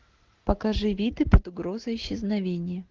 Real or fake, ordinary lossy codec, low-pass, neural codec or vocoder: real; Opus, 16 kbps; 7.2 kHz; none